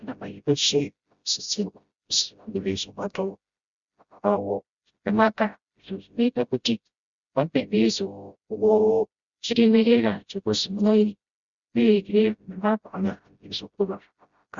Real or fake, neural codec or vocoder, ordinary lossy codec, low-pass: fake; codec, 16 kHz, 0.5 kbps, FreqCodec, smaller model; Opus, 64 kbps; 7.2 kHz